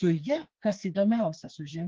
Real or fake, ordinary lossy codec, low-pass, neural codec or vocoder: fake; Opus, 16 kbps; 7.2 kHz; codec, 16 kHz, 2 kbps, FreqCodec, larger model